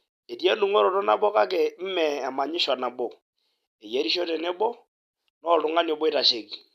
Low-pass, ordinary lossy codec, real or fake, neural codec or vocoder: 14.4 kHz; MP3, 96 kbps; real; none